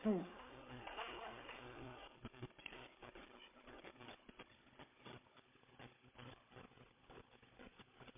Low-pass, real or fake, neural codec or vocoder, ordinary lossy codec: 3.6 kHz; fake; codec, 16 kHz, 16 kbps, FunCodec, trained on Chinese and English, 50 frames a second; MP3, 24 kbps